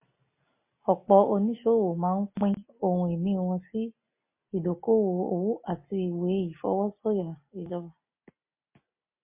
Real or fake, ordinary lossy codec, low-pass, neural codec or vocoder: real; MP3, 24 kbps; 3.6 kHz; none